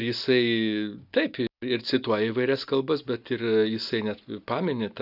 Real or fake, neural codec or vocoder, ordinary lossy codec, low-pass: real; none; AAC, 48 kbps; 5.4 kHz